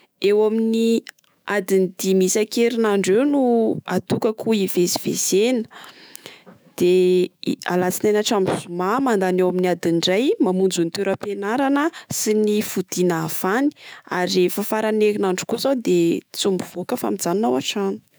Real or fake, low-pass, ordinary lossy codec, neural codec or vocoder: fake; none; none; autoencoder, 48 kHz, 128 numbers a frame, DAC-VAE, trained on Japanese speech